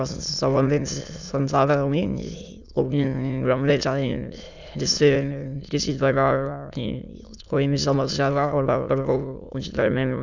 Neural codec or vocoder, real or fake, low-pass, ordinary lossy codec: autoencoder, 22.05 kHz, a latent of 192 numbers a frame, VITS, trained on many speakers; fake; 7.2 kHz; none